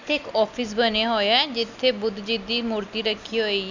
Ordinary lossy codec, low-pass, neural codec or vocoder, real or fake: none; 7.2 kHz; none; real